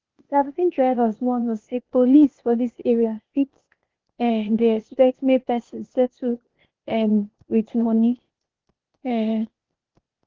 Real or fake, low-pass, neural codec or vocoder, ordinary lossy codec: fake; 7.2 kHz; codec, 16 kHz, 0.8 kbps, ZipCodec; Opus, 16 kbps